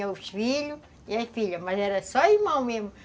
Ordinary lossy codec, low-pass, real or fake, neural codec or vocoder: none; none; real; none